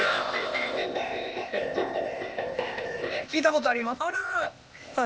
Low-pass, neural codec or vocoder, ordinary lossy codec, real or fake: none; codec, 16 kHz, 0.8 kbps, ZipCodec; none; fake